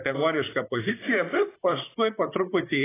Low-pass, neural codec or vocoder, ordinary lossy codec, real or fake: 3.6 kHz; vocoder, 44.1 kHz, 128 mel bands, Pupu-Vocoder; AAC, 16 kbps; fake